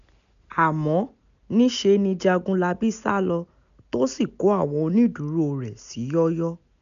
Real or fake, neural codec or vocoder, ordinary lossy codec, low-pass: real; none; none; 7.2 kHz